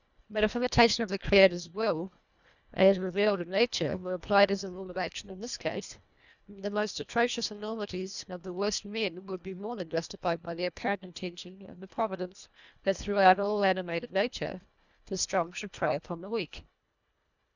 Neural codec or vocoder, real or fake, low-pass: codec, 24 kHz, 1.5 kbps, HILCodec; fake; 7.2 kHz